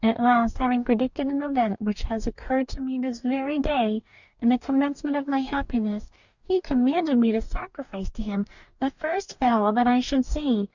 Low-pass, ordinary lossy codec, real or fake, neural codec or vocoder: 7.2 kHz; Opus, 64 kbps; fake; codec, 44.1 kHz, 2.6 kbps, DAC